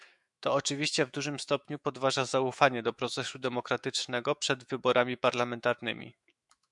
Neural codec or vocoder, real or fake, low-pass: autoencoder, 48 kHz, 128 numbers a frame, DAC-VAE, trained on Japanese speech; fake; 10.8 kHz